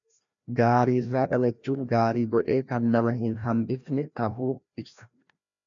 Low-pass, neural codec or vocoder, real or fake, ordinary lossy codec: 7.2 kHz; codec, 16 kHz, 1 kbps, FreqCodec, larger model; fake; MP3, 96 kbps